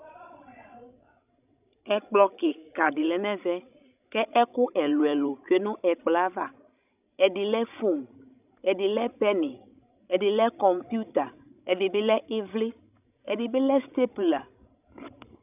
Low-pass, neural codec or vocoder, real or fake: 3.6 kHz; codec, 16 kHz, 16 kbps, FreqCodec, larger model; fake